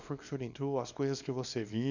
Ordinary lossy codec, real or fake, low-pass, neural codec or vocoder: none; fake; 7.2 kHz; codec, 24 kHz, 0.9 kbps, WavTokenizer, small release